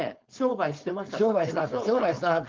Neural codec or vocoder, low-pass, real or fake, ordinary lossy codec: codec, 16 kHz, 4.8 kbps, FACodec; 7.2 kHz; fake; Opus, 32 kbps